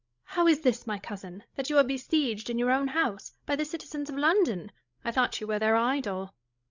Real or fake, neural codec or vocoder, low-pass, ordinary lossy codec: fake; codec, 16 kHz, 16 kbps, FreqCodec, larger model; 7.2 kHz; Opus, 64 kbps